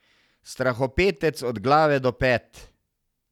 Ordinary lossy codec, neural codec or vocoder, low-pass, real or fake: none; none; 19.8 kHz; real